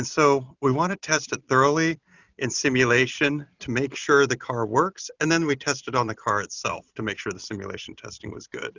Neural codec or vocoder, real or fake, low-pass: none; real; 7.2 kHz